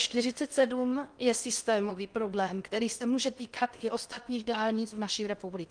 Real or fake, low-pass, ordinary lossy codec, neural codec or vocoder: fake; 9.9 kHz; Opus, 32 kbps; codec, 16 kHz in and 24 kHz out, 0.8 kbps, FocalCodec, streaming, 65536 codes